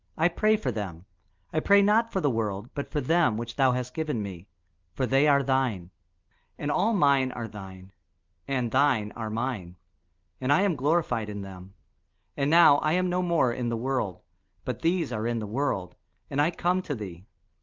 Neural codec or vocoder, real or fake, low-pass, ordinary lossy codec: none; real; 7.2 kHz; Opus, 32 kbps